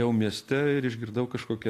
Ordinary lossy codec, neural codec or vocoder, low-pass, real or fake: AAC, 64 kbps; none; 14.4 kHz; real